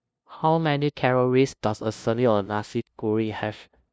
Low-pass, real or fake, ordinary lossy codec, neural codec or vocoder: none; fake; none; codec, 16 kHz, 0.5 kbps, FunCodec, trained on LibriTTS, 25 frames a second